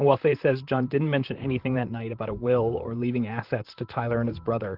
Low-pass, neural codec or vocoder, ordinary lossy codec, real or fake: 5.4 kHz; vocoder, 44.1 kHz, 128 mel bands, Pupu-Vocoder; Opus, 16 kbps; fake